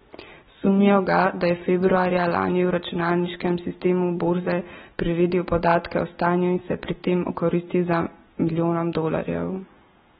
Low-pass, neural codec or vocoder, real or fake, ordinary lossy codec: 19.8 kHz; none; real; AAC, 16 kbps